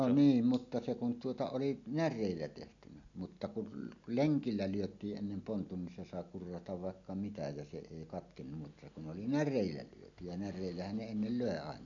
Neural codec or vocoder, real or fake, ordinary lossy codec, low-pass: none; real; none; 7.2 kHz